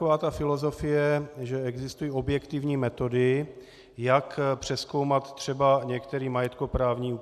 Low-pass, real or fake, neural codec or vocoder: 14.4 kHz; real; none